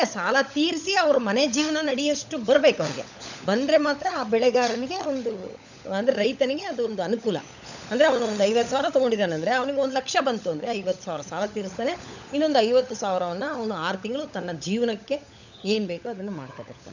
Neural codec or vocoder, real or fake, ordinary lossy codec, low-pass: codec, 16 kHz, 16 kbps, FunCodec, trained on LibriTTS, 50 frames a second; fake; none; 7.2 kHz